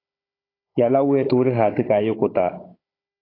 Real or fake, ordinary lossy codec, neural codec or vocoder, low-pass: fake; AAC, 24 kbps; codec, 16 kHz, 16 kbps, FunCodec, trained on Chinese and English, 50 frames a second; 5.4 kHz